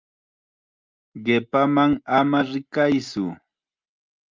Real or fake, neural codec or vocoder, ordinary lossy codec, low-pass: real; none; Opus, 24 kbps; 7.2 kHz